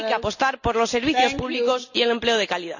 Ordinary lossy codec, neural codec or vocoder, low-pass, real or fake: none; none; 7.2 kHz; real